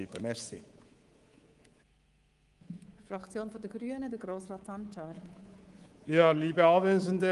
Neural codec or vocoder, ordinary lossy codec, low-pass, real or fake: codec, 24 kHz, 3.1 kbps, DualCodec; Opus, 24 kbps; 10.8 kHz; fake